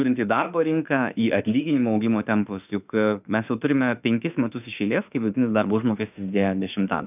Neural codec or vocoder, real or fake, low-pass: autoencoder, 48 kHz, 32 numbers a frame, DAC-VAE, trained on Japanese speech; fake; 3.6 kHz